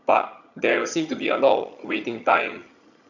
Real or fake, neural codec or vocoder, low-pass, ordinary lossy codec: fake; vocoder, 22.05 kHz, 80 mel bands, HiFi-GAN; 7.2 kHz; none